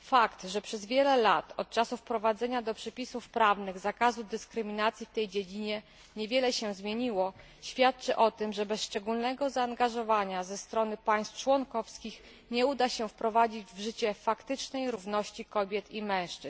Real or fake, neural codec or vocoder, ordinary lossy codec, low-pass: real; none; none; none